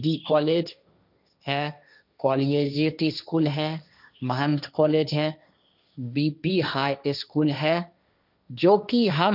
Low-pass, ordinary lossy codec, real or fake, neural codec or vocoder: 5.4 kHz; none; fake; codec, 16 kHz, 1.1 kbps, Voila-Tokenizer